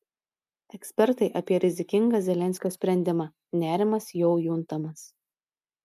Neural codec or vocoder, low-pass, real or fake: none; 14.4 kHz; real